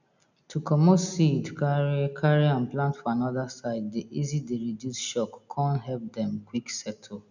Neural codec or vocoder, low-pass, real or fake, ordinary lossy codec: none; 7.2 kHz; real; none